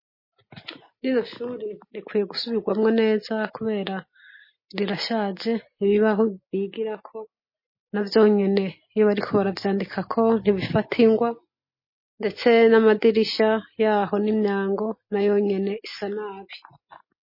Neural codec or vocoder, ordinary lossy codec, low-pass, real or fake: none; MP3, 24 kbps; 5.4 kHz; real